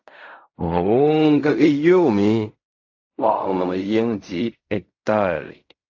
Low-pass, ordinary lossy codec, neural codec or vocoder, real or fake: 7.2 kHz; AAC, 48 kbps; codec, 16 kHz in and 24 kHz out, 0.4 kbps, LongCat-Audio-Codec, fine tuned four codebook decoder; fake